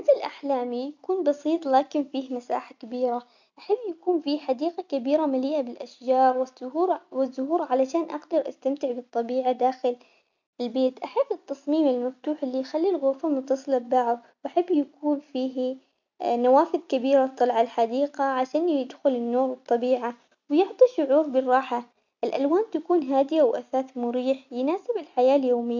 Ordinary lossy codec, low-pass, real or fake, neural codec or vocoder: none; 7.2 kHz; real; none